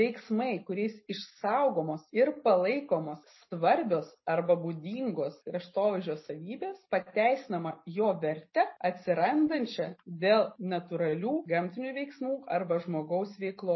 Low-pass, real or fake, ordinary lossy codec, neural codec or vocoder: 7.2 kHz; real; MP3, 24 kbps; none